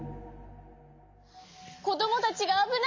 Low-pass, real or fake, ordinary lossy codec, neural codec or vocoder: 7.2 kHz; real; MP3, 48 kbps; none